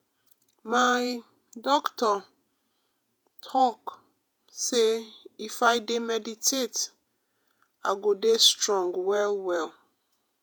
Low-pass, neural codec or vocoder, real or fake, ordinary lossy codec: none; vocoder, 48 kHz, 128 mel bands, Vocos; fake; none